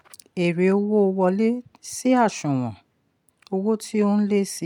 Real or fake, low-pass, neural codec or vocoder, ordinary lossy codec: real; 19.8 kHz; none; MP3, 96 kbps